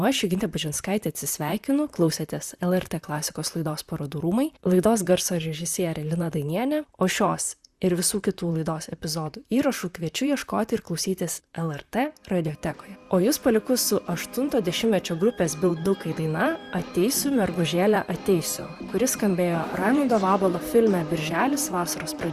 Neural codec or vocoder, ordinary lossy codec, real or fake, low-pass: vocoder, 44.1 kHz, 128 mel bands, Pupu-Vocoder; Opus, 64 kbps; fake; 14.4 kHz